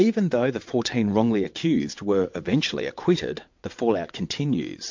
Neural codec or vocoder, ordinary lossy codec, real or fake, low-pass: vocoder, 22.05 kHz, 80 mel bands, WaveNeXt; MP3, 48 kbps; fake; 7.2 kHz